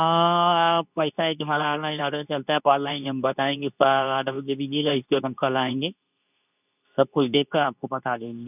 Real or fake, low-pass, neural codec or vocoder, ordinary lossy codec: fake; 3.6 kHz; codec, 24 kHz, 0.9 kbps, WavTokenizer, medium speech release version 2; none